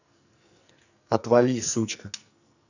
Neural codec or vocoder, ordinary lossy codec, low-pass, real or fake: codec, 44.1 kHz, 2.6 kbps, SNAC; none; 7.2 kHz; fake